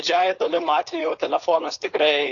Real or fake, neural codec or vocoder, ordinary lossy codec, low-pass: fake; codec, 16 kHz, 4.8 kbps, FACodec; AAC, 48 kbps; 7.2 kHz